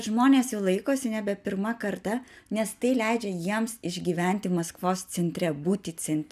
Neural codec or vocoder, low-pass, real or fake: none; 14.4 kHz; real